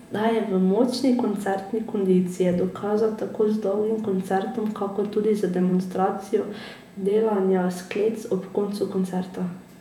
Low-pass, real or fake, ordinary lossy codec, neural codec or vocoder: 19.8 kHz; fake; none; vocoder, 48 kHz, 128 mel bands, Vocos